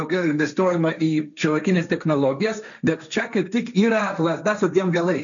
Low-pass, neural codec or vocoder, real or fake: 7.2 kHz; codec, 16 kHz, 1.1 kbps, Voila-Tokenizer; fake